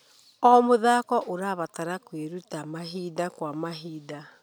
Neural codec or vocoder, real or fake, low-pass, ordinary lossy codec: none; real; none; none